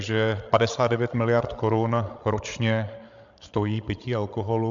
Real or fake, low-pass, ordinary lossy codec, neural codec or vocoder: fake; 7.2 kHz; MP3, 64 kbps; codec, 16 kHz, 16 kbps, FreqCodec, larger model